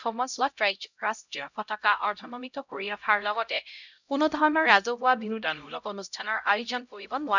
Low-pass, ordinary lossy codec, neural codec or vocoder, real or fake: 7.2 kHz; none; codec, 16 kHz, 0.5 kbps, X-Codec, HuBERT features, trained on LibriSpeech; fake